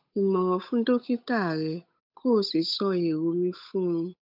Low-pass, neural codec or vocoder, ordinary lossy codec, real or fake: 5.4 kHz; codec, 16 kHz, 8 kbps, FunCodec, trained on Chinese and English, 25 frames a second; none; fake